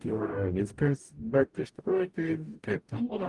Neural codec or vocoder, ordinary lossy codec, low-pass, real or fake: codec, 44.1 kHz, 0.9 kbps, DAC; Opus, 24 kbps; 10.8 kHz; fake